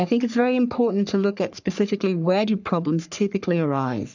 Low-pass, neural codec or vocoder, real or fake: 7.2 kHz; codec, 44.1 kHz, 3.4 kbps, Pupu-Codec; fake